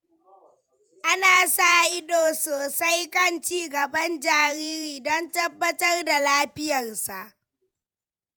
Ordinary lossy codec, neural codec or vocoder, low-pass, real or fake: none; vocoder, 48 kHz, 128 mel bands, Vocos; none; fake